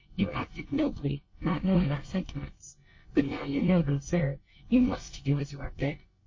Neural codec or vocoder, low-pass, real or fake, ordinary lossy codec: codec, 24 kHz, 1 kbps, SNAC; 7.2 kHz; fake; MP3, 32 kbps